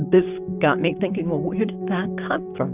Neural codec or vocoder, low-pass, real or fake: codec, 16 kHz, 6 kbps, DAC; 3.6 kHz; fake